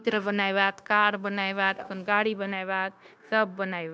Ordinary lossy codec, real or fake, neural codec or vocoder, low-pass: none; fake; codec, 16 kHz, 0.9 kbps, LongCat-Audio-Codec; none